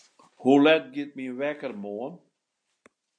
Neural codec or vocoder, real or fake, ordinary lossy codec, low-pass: none; real; MP3, 64 kbps; 9.9 kHz